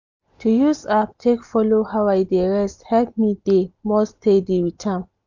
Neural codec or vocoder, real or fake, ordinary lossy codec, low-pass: none; real; none; 7.2 kHz